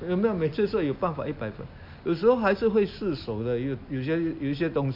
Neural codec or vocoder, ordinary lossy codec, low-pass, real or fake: none; MP3, 48 kbps; 5.4 kHz; real